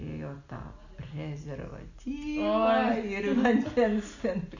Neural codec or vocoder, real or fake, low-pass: none; real; 7.2 kHz